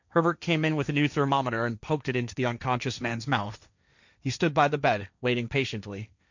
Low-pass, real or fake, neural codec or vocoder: 7.2 kHz; fake; codec, 16 kHz, 1.1 kbps, Voila-Tokenizer